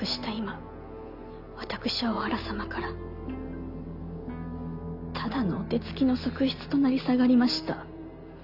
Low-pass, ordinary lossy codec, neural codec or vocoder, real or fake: 5.4 kHz; none; none; real